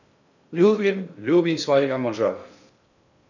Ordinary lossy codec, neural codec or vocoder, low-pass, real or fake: none; codec, 16 kHz in and 24 kHz out, 0.6 kbps, FocalCodec, streaming, 2048 codes; 7.2 kHz; fake